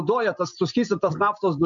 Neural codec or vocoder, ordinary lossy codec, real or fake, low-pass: none; MP3, 64 kbps; real; 7.2 kHz